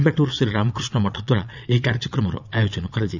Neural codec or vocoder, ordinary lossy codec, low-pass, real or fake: vocoder, 22.05 kHz, 80 mel bands, Vocos; none; 7.2 kHz; fake